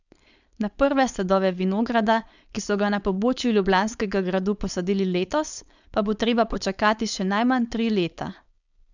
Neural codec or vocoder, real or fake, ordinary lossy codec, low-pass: codec, 16 kHz, 4.8 kbps, FACodec; fake; none; 7.2 kHz